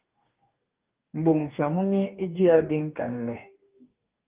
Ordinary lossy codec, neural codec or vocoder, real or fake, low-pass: Opus, 24 kbps; codec, 44.1 kHz, 2.6 kbps, DAC; fake; 3.6 kHz